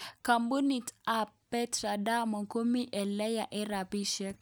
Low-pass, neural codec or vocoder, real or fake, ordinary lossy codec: none; none; real; none